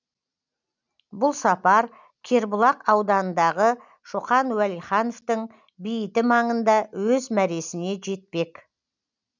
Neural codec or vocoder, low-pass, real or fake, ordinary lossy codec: none; 7.2 kHz; real; none